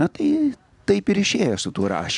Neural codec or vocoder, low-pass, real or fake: none; 10.8 kHz; real